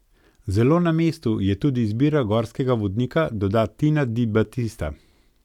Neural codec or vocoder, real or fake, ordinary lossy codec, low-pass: none; real; none; 19.8 kHz